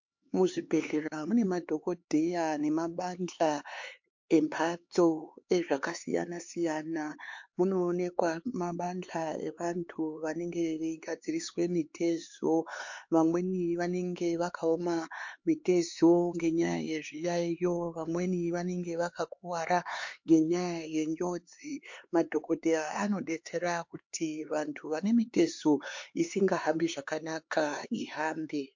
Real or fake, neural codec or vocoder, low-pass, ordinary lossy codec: fake; codec, 16 kHz, 4 kbps, X-Codec, HuBERT features, trained on LibriSpeech; 7.2 kHz; MP3, 48 kbps